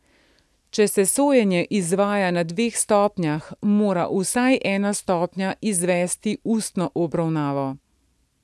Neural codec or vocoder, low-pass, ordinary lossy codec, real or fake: none; none; none; real